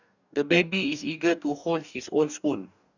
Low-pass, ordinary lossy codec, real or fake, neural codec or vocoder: 7.2 kHz; none; fake; codec, 44.1 kHz, 2.6 kbps, DAC